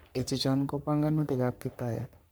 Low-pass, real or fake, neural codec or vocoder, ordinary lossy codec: none; fake; codec, 44.1 kHz, 3.4 kbps, Pupu-Codec; none